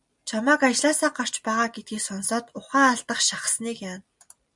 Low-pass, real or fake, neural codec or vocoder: 10.8 kHz; real; none